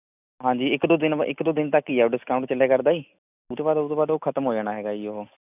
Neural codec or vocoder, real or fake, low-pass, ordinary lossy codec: none; real; 3.6 kHz; none